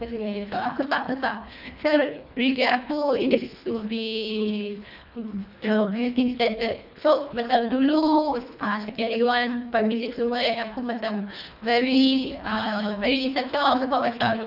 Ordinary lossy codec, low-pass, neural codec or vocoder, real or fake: none; 5.4 kHz; codec, 24 kHz, 1.5 kbps, HILCodec; fake